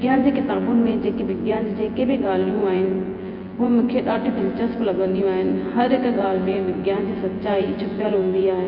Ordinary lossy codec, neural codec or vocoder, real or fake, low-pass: Opus, 32 kbps; vocoder, 24 kHz, 100 mel bands, Vocos; fake; 5.4 kHz